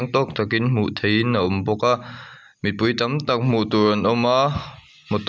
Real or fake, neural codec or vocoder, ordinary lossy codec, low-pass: real; none; none; none